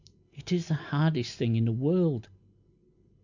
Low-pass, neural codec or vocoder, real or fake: 7.2 kHz; none; real